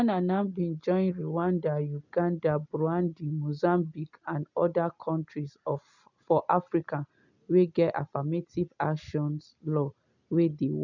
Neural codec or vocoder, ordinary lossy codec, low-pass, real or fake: none; none; 7.2 kHz; real